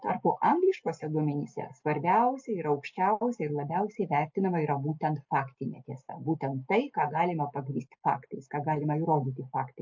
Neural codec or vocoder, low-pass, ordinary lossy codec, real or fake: none; 7.2 kHz; MP3, 48 kbps; real